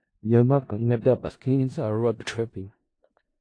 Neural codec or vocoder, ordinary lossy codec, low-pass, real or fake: codec, 16 kHz in and 24 kHz out, 0.4 kbps, LongCat-Audio-Codec, four codebook decoder; AAC, 48 kbps; 9.9 kHz; fake